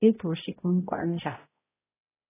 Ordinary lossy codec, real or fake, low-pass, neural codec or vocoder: AAC, 16 kbps; fake; 3.6 kHz; codec, 16 kHz, 0.5 kbps, X-Codec, HuBERT features, trained on balanced general audio